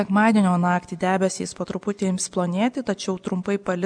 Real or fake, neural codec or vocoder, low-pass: real; none; 9.9 kHz